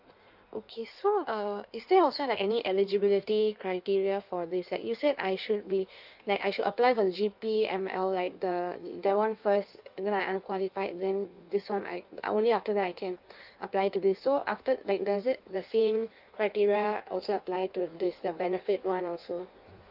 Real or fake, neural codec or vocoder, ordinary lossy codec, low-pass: fake; codec, 16 kHz in and 24 kHz out, 1.1 kbps, FireRedTTS-2 codec; none; 5.4 kHz